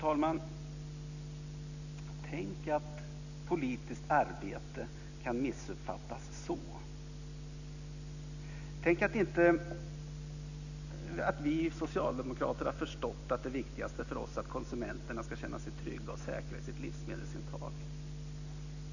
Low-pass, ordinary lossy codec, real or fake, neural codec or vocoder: 7.2 kHz; none; real; none